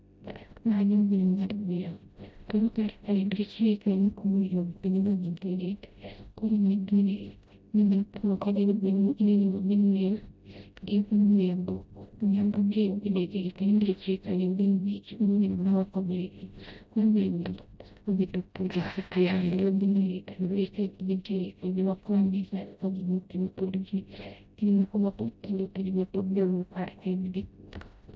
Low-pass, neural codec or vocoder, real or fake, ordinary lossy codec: none; codec, 16 kHz, 0.5 kbps, FreqCodec, smaller model; fake; none